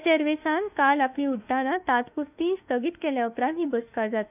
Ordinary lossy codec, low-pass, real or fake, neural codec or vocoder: none; 3.6 kHz; fake; autoencoder, 48 kHz, 32 numbers a frame, DAC-VAE, trained on Japanese speech